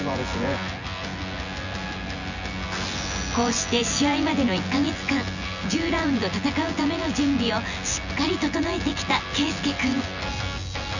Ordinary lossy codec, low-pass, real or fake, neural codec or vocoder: none; 7.2 kHz; fake; vocoder, 24 kHz, 100 mel bands, Vocos